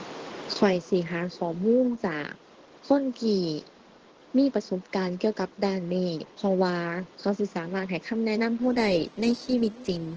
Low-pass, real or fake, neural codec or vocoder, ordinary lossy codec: 7.2 kHz; fake; codec, 16 kHz in and 24 kHz out, 1 kbps, XY-Tokenizer; Opus, 16 kbps